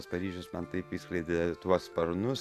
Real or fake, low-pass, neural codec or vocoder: real; 14.4 kHz; none